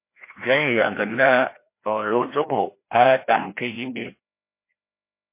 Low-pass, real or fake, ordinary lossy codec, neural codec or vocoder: 3.6 kHz; fake; MP3, 24 kbps; codec, 16 kHz, 1 kbps, FreqCodec, larger model